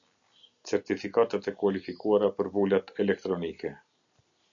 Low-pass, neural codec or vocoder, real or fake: 7.2 kHz; none; real